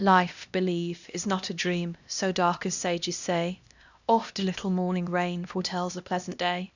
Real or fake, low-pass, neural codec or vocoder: fake; 7.2 kHz; codec, 16 kHz, 1 kbps, X-Codec, HuBERT features, trained on LibriSpeech